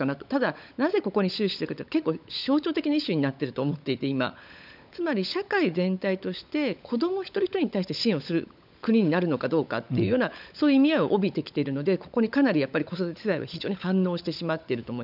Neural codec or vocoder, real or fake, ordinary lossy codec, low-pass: codec, 16 kHz, 16 kbps, FunCodec, trained on LibriTTS, 50 frames a second; fake; none; 5.4 kHz